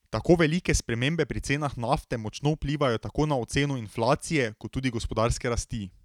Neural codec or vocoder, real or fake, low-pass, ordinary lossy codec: none; real; 19.8 kHz; none